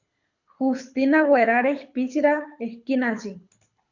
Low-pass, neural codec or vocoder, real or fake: 7.2 kHz; codec, 24 kHz, 6 kbps, HILCodec; fake